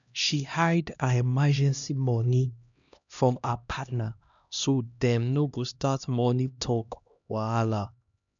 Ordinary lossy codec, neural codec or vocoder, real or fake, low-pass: none; codec, 16 kHz, 1 kbps, X-Codec, HuBERT features, trained on LibriSpeech; fake; 7.2 kHz